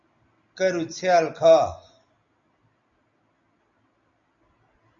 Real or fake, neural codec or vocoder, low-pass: real; none; 7.2 kHz